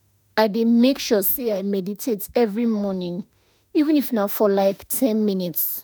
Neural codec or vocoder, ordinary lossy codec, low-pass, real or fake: autoencoder, 48 kHz, 32 numbers a frame, DAC-VAE, trained on Japanese speech; none; none; fake